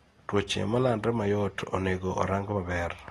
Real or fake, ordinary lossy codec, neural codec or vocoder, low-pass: real; AAC, 32 kbps; none; 19.8 kHz